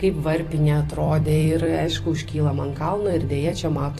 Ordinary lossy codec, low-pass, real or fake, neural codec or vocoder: AAC, 48 kbps; 14.4 kHz; fake; vocoder, 44.1 kHz, 128 mel bands every 256 samples, BigVGAN v2